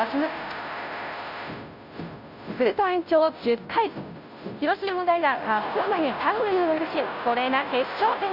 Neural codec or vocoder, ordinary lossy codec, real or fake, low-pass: codec, 16 kHz, 0.5 kbps, FunCodec, trained on Chinese and English, 25 frames a second; none; fake; 5.4 kHz